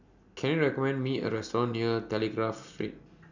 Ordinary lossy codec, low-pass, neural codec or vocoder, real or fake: none; 7.2 kHz; none; real